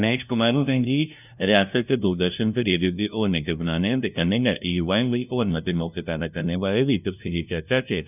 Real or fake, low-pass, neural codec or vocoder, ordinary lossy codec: fake; 3.6 kHz; codec, 16 kHz, 0.5 kbps, FunCodec, trained on LibriTTS, 25 frames a second; none